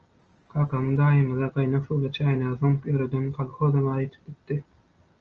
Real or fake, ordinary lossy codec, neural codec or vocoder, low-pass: real; Opus, 24 kbps; none; 7.2 kHz